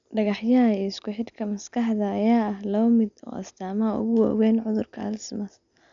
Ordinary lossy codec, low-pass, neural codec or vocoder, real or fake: none; 7.2 kHz; none; real